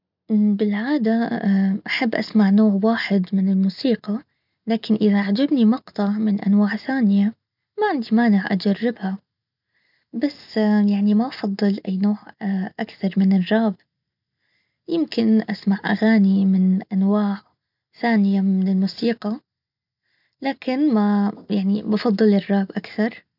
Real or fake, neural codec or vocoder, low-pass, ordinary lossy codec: real; none; 5.4 kHz; none